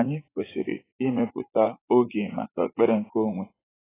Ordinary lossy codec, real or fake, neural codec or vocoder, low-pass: AAC, 16 kbps; fake; vocoder, 44.1 kHz, 80 mel bands, Vocos; 3.6 kHz